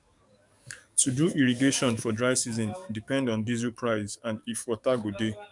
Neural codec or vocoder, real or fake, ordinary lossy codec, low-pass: codec, 44.1 kHz, 7.8 kbps, DAC; fake; none; 10.8 kHz